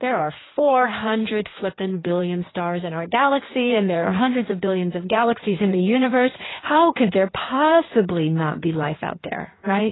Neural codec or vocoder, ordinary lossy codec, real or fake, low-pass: codec, 16 kHz in and 24 kHz out, 1.1 kbps, FireRedTTS-2 codec; AAC, 16 kbps; fake; 7.2 kHz